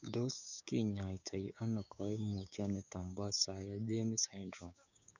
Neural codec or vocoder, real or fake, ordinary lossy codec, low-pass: codec, 16 kHz, 6 kbps, DAC; fake; none; 7.2 kHz